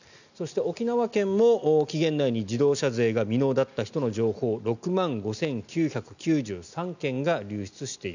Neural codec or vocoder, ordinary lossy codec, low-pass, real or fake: none; none; 7.2 kHz; real